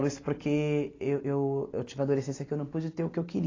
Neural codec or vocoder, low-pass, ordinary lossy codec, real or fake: none; 7.2 kHz; AAC, 32 kbps; real